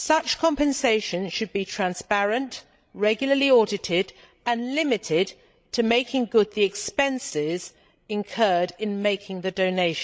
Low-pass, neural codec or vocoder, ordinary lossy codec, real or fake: none; codec, 16 kHz, 16 kbps, FreqCodec, larger model; none; fake